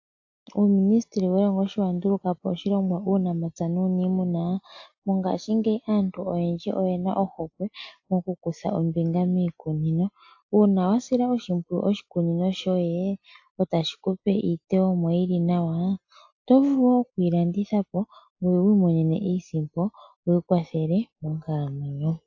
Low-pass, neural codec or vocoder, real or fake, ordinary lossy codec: 7.2 kHz; none; real; AAC, 48 kbps